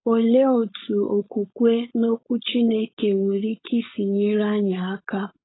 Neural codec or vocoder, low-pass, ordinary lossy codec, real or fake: codec, 16 kHz, 4.8 kbps, FACodec; 7.2 kHz; AAC, 16 kbps; fake